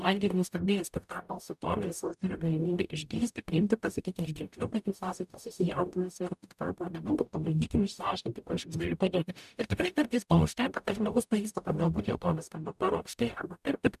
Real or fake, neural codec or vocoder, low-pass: fake; codec, 44.1 kHz, 0.9 kbps, DAC; 14.4 kHz